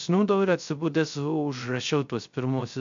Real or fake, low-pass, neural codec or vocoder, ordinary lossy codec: fake; 7.2 kHz; codec, 16 kHz, 0.3 kbps, FocalCodec; MP3, 64 kbps